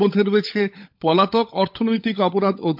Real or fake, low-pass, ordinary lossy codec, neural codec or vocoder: fake; 5.4 kHz; MP3, 48 kbps; codec, 16 kHz, 16 kbps, FunCodec, trained on LibriTTS, 50 frames a second